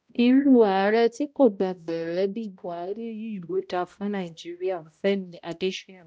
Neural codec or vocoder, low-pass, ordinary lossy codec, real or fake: codec, 16 kHz, 0.5 kbps, X-Codec, HuBERT features, trained on balanced general audio; none; none; fake